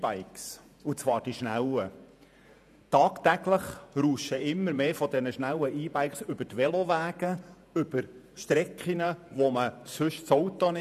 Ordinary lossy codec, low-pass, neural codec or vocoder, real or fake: none; 14.4 kHz; vocoder, 48 kHz, 128 mel bands, Vocos; fake